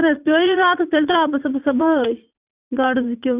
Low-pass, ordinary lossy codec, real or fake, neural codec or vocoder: 3.6 kHz; Opus, 64 kbps; fake; vocoder, 44.1 kHz, 80 mel bands, Vocos